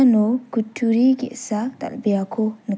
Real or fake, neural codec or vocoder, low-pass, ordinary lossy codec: real; none; none; none